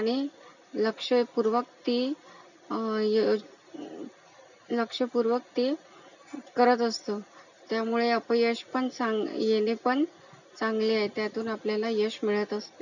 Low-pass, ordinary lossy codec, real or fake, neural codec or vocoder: 7.2 kHz; none; real; none